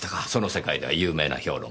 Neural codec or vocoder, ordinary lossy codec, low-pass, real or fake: none; none; none; real